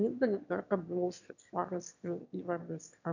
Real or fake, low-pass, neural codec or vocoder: fake; 7.2 kHz; autoencoder, 22.05 kHz, a latent of 192 numbers a frame, VITS, trained on one speaker